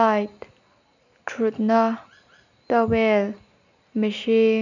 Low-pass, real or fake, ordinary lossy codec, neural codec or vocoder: 7.2 kHz; real; none; none